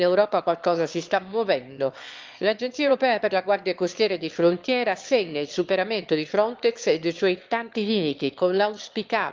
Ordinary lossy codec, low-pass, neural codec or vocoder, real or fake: Opus, 32 kbps; 7.2 kHz; autoencoder, 22.05 kHz, a latent of 192 numbers a frame, VITS, trained on one speaker; fake